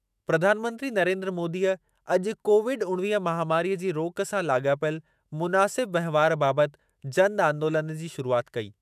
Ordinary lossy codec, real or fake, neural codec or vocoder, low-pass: none; fake; autoencoder, 48 kHz, 128 numbers a frame, DAC-VAE, trained on Japanese speech; 14.4 kHz